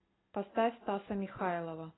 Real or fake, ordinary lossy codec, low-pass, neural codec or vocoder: real; AAC, 16 kbps; 7.2 kHz; none